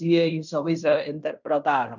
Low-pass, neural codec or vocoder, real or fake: 7.2 kHz; codec, 16 kHz in and 24 kHz out, 0.9 kbps, LongCat-Audio-Codec, fine tuned four codebook decoder; fake